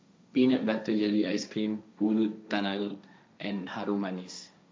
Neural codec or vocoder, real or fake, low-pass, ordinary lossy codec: codec, 16 kHz, 1.1 kbps, Voila-Tokenizer; fake; none; none